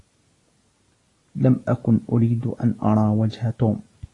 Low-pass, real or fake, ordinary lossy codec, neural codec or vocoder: 10.8 kHz; real; AAC, 32 kbps; none